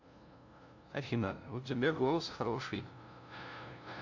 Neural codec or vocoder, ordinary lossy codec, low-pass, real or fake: codec, 16 kHz, 0.5 kbps, FunCodec, trained on LibriTTS, 25 frames a second; AAC, 48 kbps; 7.2 kHz; fake